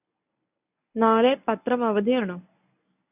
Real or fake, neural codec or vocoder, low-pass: fake; codec, 24 kHz, 0.9 kbps, WavTokenizer, medium speech release version 1; 3.6 kHz